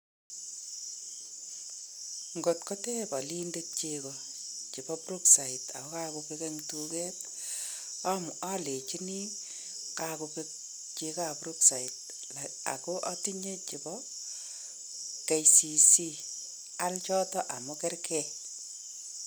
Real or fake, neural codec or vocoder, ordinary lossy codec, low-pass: real; none; none; none